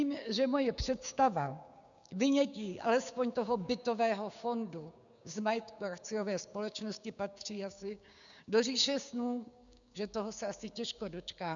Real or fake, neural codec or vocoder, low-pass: fake; codec, 16 kHz, 6 kbps, DAC; 7.2 kHz